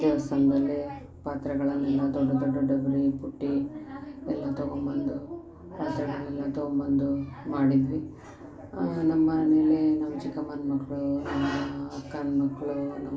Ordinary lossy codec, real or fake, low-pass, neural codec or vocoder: none; real; none; none